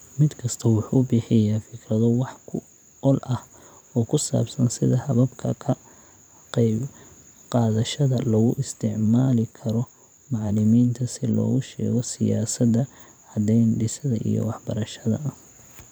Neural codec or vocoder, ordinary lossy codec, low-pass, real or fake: vocoder, 44.1 kHz, 128 mel bands every 512 samples, BigVGAN v2; none; none; fake